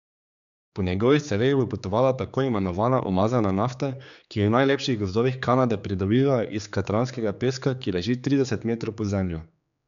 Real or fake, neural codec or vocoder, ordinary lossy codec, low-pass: fake; codec, 16 kHz, 4 kbps, X-Codec, HuBERT features, trained on general audio; none; 7.2 kHz